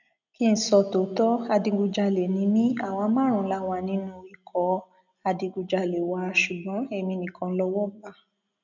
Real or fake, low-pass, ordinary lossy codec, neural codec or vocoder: real; 7.2 kHz; none; none